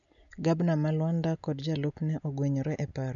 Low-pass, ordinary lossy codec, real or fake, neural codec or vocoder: 7.2 kHz; none; real; none